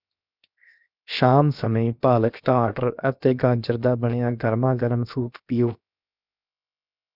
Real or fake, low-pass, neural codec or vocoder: fake; 5.4 kHz; codec, 16 kHz, 0.7 kbps, FocalCodec